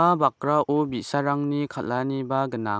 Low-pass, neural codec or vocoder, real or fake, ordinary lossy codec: none; none; real; none